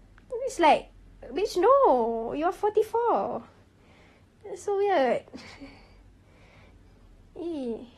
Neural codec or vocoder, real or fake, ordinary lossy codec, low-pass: none; real; AAC, 32 kbps; 19.8 kHz